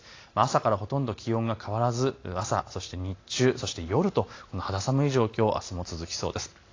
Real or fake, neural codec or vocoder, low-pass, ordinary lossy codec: real; none; 7.2 kHz; AAC, 32 kbps